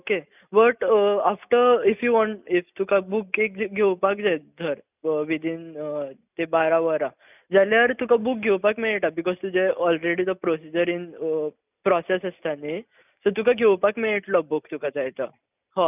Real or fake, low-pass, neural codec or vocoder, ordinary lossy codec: real; 3.6 kHz; none; none